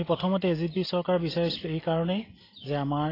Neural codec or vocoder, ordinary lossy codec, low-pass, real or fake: none; AAC, 24 kbps; 5.4 kHz; real